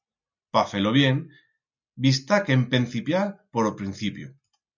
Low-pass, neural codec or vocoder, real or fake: 7.2 kHz; none; real